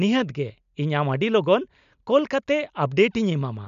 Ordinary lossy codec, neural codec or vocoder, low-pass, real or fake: none; none; 7.2 kHz; real